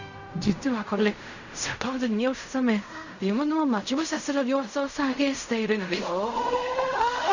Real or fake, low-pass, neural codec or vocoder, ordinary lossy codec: fake; 7.2 kHz; codec, 16 kHz in and 24 kHz out, 0.4 kbps, LongCat-Audio-Codec, fine tuned four codebook decoder; none